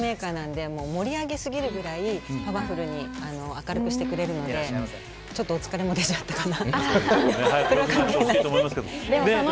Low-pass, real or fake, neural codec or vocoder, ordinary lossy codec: none; real; none; none